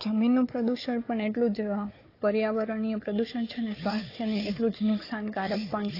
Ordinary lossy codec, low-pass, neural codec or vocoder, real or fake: AAC, 24 kbps; 5.4 kHz; codec, 16 kHz, 4 kbps, FunCodec, trained on Chinese and English, 50 frames a second; fake